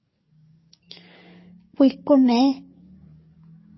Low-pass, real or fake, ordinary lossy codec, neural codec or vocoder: 7.2 kHz; fake; MP3, 24 kbps; codec, 44.1 kHz, 7.8 kbps, DAC